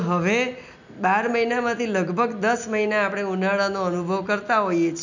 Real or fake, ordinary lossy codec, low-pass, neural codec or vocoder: real; none; 7.2 kHz; none